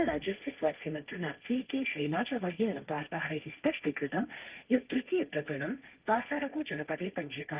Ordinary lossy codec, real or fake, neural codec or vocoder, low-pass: Opus, 24 kbps; fake; codec, 16 kHz, 1.1 kbps, Voila-Tokenizer; 3.6 kHz